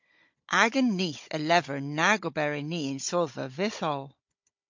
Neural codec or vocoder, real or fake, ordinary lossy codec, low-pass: codec, 16 kHz, 16 kbps, FunCodec, trained on Chinese and English, 50 frames a second; fake; MP3, 48 kbps; 7.2 kHz